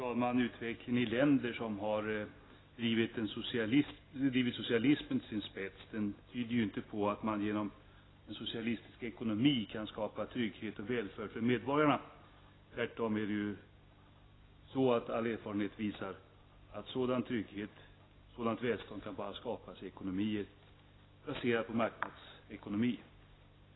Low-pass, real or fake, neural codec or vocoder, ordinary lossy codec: 7.2 kHz; real; none; AAC, 16 kbps